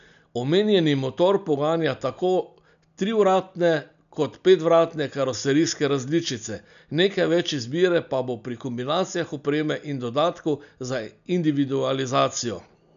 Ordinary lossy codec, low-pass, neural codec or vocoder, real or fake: none; 7.2 kHz; none; real